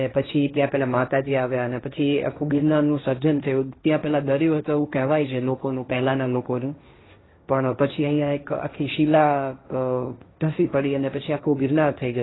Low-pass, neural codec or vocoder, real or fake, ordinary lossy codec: 7.2 kHz; codec, 16 kHz, 1.1 kbps, Voila-Tokenizer; fake; AAC, 16 kbps